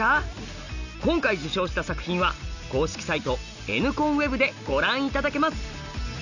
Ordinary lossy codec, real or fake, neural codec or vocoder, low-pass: none; real; none; 7.2 kHz